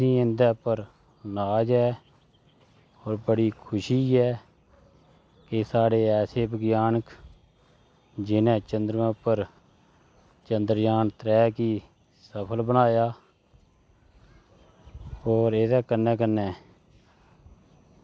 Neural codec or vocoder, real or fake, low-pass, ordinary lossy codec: none; real; none; none